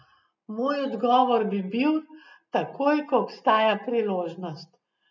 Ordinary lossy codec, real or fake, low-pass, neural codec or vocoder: none; real; 7.2 kHz; none